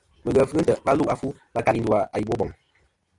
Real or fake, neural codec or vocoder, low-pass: real; none; 10.8 kHz